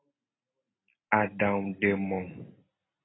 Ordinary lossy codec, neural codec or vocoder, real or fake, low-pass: AAC, 16 kbps; none; real; 7.2 kHz